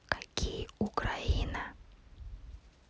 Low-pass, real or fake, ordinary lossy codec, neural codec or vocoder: none; real; none; none